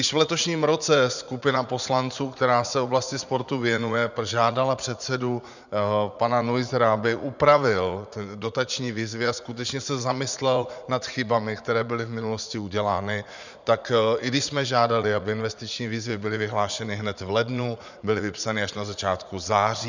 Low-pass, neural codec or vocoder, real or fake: 7.2 kHz; vocoder, 44.1 kHz, 80 mel bands, Vocos; fake